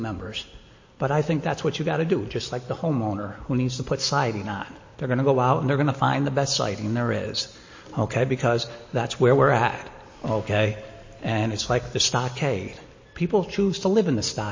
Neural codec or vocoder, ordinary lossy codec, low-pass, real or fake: none; MP3, 32 kbps; 7.2 kHz; real